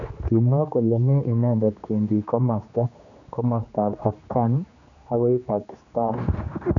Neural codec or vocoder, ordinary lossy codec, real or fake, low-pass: codec, 16 kHz, 2 kbps, X-Codec, HuBERT features, trained on general audio; none; fake; 7.2 kHz